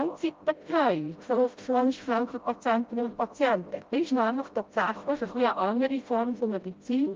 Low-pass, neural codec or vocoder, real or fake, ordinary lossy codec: 7.2 kHz; codec, 16 kHz, 0.5 kbps, FreqCodec, smaller model; fake; Opus, 32 kbps